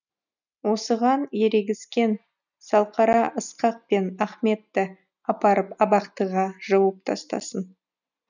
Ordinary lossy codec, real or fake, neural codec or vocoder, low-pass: none; real; none; 7.2 kHz